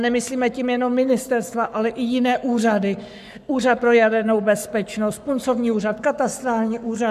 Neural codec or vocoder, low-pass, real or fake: codec, 44.1 kHz, 7.8 kbps, Pupu-Codec; 14.4 kHz; fake